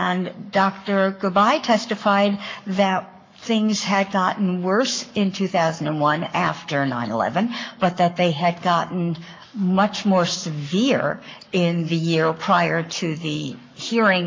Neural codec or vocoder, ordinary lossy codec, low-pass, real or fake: codec, 44.1 kHz, 7.8 kbps, Pupu-Codec; MP3, 48 kbps; 7.2 kHz; fake